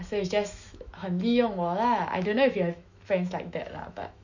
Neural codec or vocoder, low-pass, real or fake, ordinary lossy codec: autoencoder, 48 kHz, 128 numbers a frame, DAC-VAE, trained on Japanese speech; 7.2 kHz; fake; none